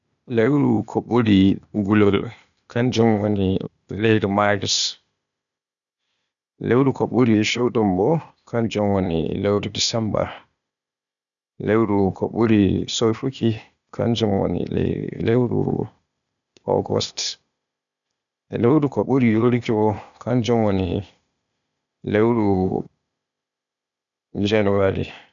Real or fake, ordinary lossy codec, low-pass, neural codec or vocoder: fake; none; 7.2 kHz; codec, 16 kHz, 0.8 kbps, ZipCodec